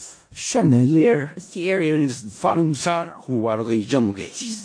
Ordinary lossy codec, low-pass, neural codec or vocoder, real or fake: AAC, 48 kbps; 9.9 kHz; codec, 16 kHz in and 24 kHz out, 0.4 kbps, LongCat-Audio-Codec, four codebook decoder; fake